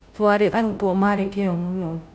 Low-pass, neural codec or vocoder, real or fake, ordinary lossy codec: none; codec, 16 kHz, 0.5 kbps, FunCodec, trained on Chinese and English, 25 frames a second; fake; none